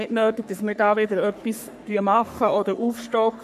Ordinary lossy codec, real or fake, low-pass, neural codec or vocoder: none; fake; 14.4 kHz; codec, 44.1 kHz, 3.4 kbps, Pupu-Codec